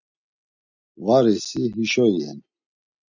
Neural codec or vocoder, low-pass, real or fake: none; 7.2 kHz; real